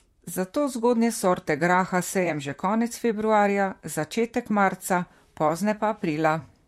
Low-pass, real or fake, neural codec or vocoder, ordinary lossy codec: 19.8 kHz; fake; vocoder, 44.1 kHz, 128 mel bands, Pupu-Vocoder; MP3, 64 kbps